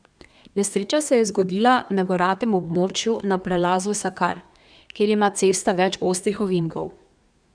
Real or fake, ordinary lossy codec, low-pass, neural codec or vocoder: fake; none; 9.9 kHz; codec, 24 kHz, 1 kbps, SNAC